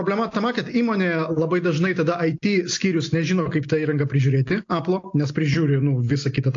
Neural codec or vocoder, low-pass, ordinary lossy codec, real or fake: none; 7.2 kHz; AAC, 48 kbps; real